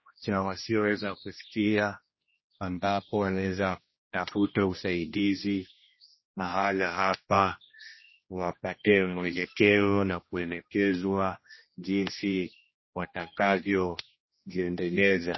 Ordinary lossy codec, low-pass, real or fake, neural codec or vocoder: MP3, 24 kbps; 7.2 kHz; fake; codec, 16 kHz, 1 kbps, X-Codec, HuBERT features, trained on general audio